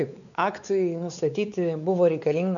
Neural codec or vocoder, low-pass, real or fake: codec, 16 kHz, 4 kbps, X-Codec, WavLM features, trained on Multilingual LibriSpeech; 7.2 kHz; fake